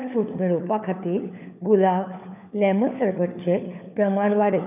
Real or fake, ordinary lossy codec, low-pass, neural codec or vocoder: fake; none; 3.6 kHz; codec, 16 kHz, 4 kbps, FunCodec, trained on LibriTTS, 50 frames a second